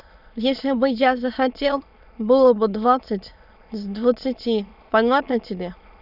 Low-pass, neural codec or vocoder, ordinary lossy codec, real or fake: 5.4 kHz; autoencoder, 22.05 kHz, a latent of 192 numbers a frame, VITS, trained on many speakers; none; fake